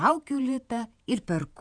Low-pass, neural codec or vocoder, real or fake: 9.9 kHz; vocoder, 48 kHz, 128 mel bands, Vocos; fake